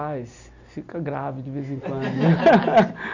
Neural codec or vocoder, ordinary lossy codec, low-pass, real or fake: none; none; 7.2 kHz; real